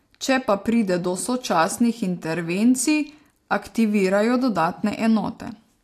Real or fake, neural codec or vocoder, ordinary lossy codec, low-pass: real; none; AAC, 64 kbps; 14.4 kHz